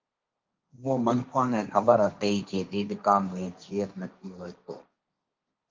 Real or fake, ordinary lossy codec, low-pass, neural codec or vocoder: fake; Opus, 24 kbps; 7.2 kHz; codec, 16 kHz, 1.1 kbps, Voila-Tokenizer